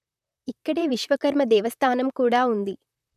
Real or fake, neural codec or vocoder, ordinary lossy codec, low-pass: fake; vocoder, 44.1 kHz, 128 mel bands, Pupu-Vocoder; none; 14.4 kHz